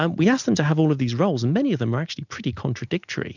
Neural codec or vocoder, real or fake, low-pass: none; real; 7.2 kHz